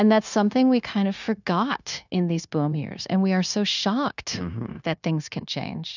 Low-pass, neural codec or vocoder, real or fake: 7.2 kHz; codec, 16 kHz, 0.9 kbps, LongCat-Audio-Codec; fake